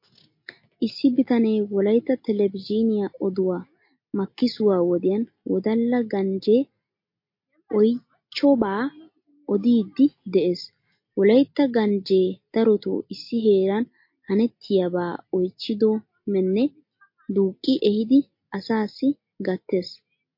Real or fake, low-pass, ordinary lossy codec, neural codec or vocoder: real; 5.4 kHz; MP3, 32 kbps; none